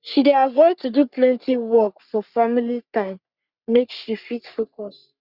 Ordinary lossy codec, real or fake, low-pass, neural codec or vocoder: Opus, 64 kbps; fake; 5.4 kHz; codec, 44.1 kHz, 3.4 kbps, Pupu-Codec